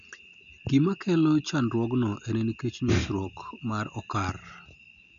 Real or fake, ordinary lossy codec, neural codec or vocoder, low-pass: real; none; none; 7.2 kHz